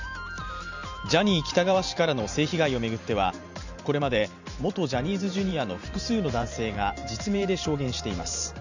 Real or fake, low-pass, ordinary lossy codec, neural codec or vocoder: fake; 7.2 kHz; none; vocoder, 44.1 kHz, 128 mel bands every 512 samples, BigVGAN v2